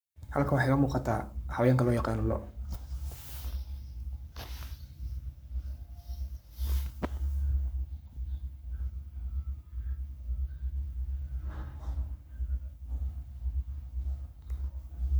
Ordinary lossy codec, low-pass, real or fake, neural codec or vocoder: none; none; fake; codec, 44.1 kHz, 7.8 kbps, Pupu-Codec